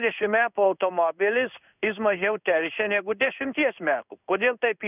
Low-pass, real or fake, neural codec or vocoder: 3.6 kHz; fake; codec, 16 kHz in and 24 kHz out, 1 kbps, XY-Tokenizer